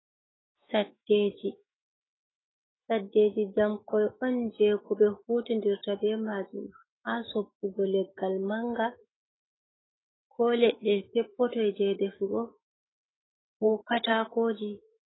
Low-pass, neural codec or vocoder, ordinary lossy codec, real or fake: 7.2 kHz; autoencoder, 48 kHz, 128 numbers a frame, DAC-VAE, trained on Japanese speech; AAC, 16 kbps; fake